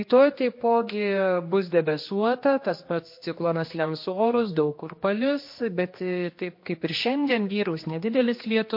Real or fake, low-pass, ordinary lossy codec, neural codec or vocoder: fake; 5.4 kHz; MP3, 32 kbps; codec, 16 kHz, 2 kbps, X-Codec, HuBERT features, trained on general audio